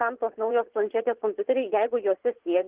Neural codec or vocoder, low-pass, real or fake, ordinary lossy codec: vocoder, 44.1 kHz, 80 mel bands, Vocos; 3.6 kHz; fake; Opus, 16 kbps